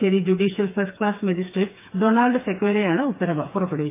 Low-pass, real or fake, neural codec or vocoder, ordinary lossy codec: 3.6 kHz; fake; codec, 16 kHz, 4 kbps, FreqCodec, smaller model; AAC, 24 kbps